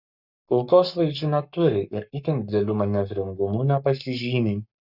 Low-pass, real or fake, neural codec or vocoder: 5.4 kHz; fake; codec, 44.1 kHz, 7.8 kbps, Pupu-Codec